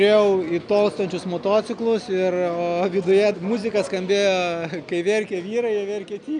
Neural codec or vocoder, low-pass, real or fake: none; 9.9 kHz; real